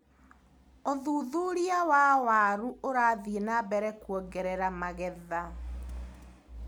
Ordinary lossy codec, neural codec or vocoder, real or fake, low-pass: none; none; real; none